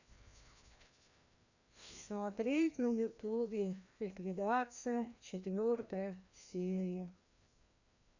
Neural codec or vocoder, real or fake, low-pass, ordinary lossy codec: codec, 16 kHz, 1 kbps, FreqCodec, larger model; fake; 7.2 kHz; none